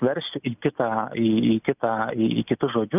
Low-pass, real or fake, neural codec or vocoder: 3.6 kHz; real; none